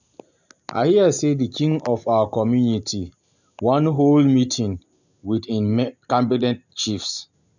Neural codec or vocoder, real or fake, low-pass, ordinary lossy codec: none; real; 7.2 kHz; none